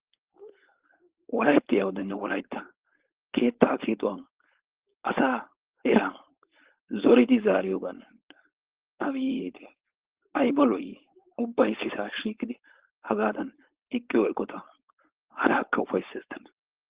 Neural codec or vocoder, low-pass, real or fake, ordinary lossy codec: codec, 16 kHz, 4.8 kbps, FACodec; 3.6 kHz; fake; Opus, 16 kbps